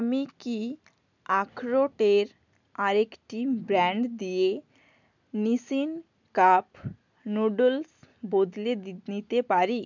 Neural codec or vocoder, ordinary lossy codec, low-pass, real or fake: none; none; 7.2 kHz; real